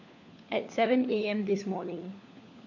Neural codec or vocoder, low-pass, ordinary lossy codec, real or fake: codec, 16 kHz, 4 kbps, FunCodec, trained on LibriTTS, 50 frames a second; 7.2 kHz; none; fake